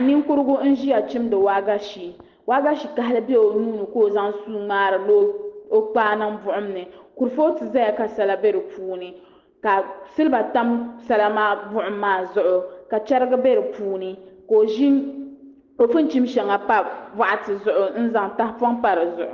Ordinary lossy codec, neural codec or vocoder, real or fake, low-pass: Opus, 16 kbps; none; real; 7.2 kHz